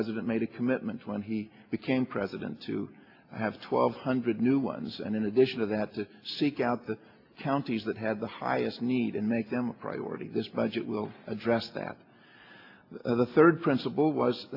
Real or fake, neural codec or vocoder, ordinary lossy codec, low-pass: real; none; AAC, 32 kbps; 5.4 kHz